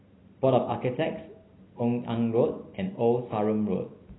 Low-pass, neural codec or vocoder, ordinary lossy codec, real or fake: 7.2 kHz; none; AAC, 16 kbps; real